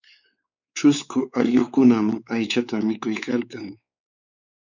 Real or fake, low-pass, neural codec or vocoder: fake; 7.2 kHz; codec, 16 kHz, 4 kbps, X-Codec, WavLM features, trained on Multilingual LibriSpeech